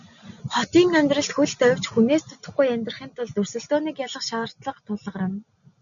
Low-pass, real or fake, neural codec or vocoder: 7.2 kHz; real; none